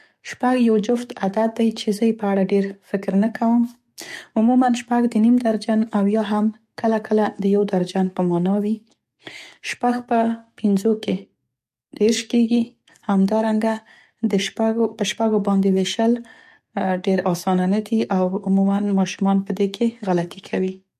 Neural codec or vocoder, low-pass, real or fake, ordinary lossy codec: codec, 44.1 kHz, 7.8 kbps, DAC; 14.4 kHz; fake; MP3, 64 kbps